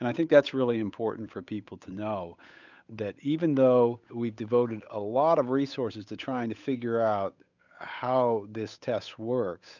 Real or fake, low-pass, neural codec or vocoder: fake; 7.2 kHz; codec, 16 kHz, 8 kbps, FunCodec, trained on Chinese and English, 25 frames a second